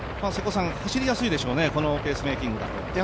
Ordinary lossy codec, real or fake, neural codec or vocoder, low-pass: none; real; none; none